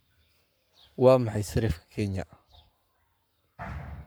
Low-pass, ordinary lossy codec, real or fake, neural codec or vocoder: none; none; fake; codec, 44.1 kHz, 7.8 kbps, Pupu-Codec